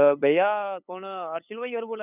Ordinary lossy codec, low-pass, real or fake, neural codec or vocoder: none; 3.6 kHz; fake; codec, 16 kHz, 8 kbps, FunCodec, trained on LibriTTS, 25 frames a second